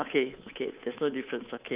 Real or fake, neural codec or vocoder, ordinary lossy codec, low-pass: fake; codec, 24 kHz, 3.1 kbps, DualCodec; Opus, 32 kbps; 3.6 kHz